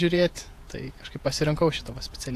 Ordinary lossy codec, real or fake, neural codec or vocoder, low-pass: AAC, 64 kbps; fake; vocoder, 48 kHz, 128 mel bands, Vocos; 14.4 kHz